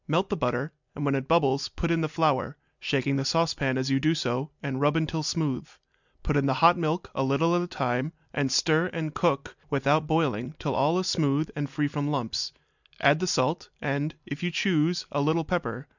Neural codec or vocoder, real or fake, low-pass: none; real; 7.2 kHz